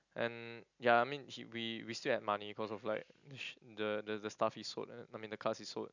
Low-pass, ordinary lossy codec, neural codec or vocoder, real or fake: 7.2 kHz; none; none; real